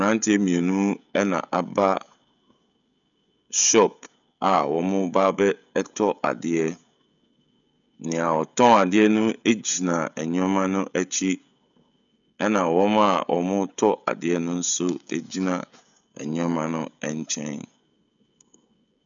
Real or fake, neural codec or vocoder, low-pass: fake; codec, 16 kHz, 16 kbps, FreqCodec, smaller model; 7.2 kHz